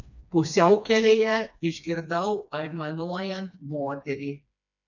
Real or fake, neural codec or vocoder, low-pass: fake; codec, 16 kHz, 2 kbps, FreqCodec, smaller model; 7.2 kHz